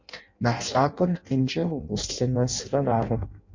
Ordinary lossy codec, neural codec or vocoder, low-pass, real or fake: MP3, 48 kbps; codec, 16 kHz in and 24 kHz out, 0.6 kbps, FireRedTTS-2 codec; 7.2 kHz; fake